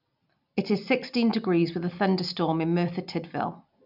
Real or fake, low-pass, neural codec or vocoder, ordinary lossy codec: real; 5.4 kHz; none; none